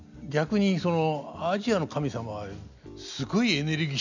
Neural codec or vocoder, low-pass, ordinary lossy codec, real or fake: none; 7.2 kHz; none; real